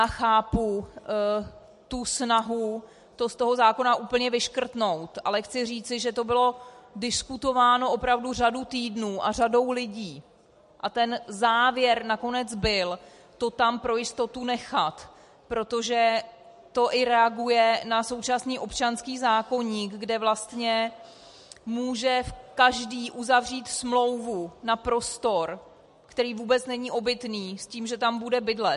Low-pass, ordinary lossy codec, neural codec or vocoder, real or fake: 14.4 kHz; MP3, 48 kbps; none; real